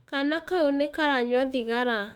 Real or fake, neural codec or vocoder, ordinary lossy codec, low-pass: fake; autoencoder, 48 kHz, 32 numbers a frame, DAC-VAE, trained on Japanese speech; none; 19.8 kHz